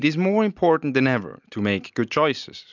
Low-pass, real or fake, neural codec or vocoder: 7.2 kHz; real; none